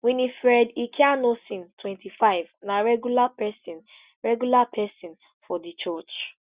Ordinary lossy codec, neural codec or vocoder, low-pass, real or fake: Opus, 64 kbps; none; 3.6 kHz; real